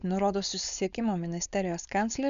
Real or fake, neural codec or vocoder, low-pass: fake; codec, 16 kHz, 4.8 kbps, FACodec; 7.2 kHz